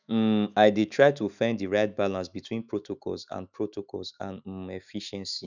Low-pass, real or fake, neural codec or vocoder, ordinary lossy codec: 7.2 kHz; fake; autoencoder, 48 kHz, 128 numbers a frame, DAC-VAE, trained on Japanese speech; none